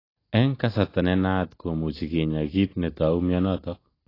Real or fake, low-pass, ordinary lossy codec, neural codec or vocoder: real; 5.4 kHz; AAC, 24 kbps; none